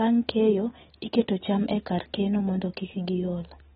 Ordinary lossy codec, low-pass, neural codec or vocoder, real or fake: AAC, 16 kbps; 19.8 kHz; none; real